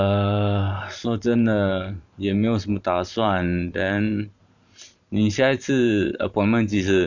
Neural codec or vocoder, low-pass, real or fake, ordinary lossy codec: vocoder, 44.1 kHz, 128 mel bands every 512 samples, BigVGAN v2; 7.2 kHz; fake; none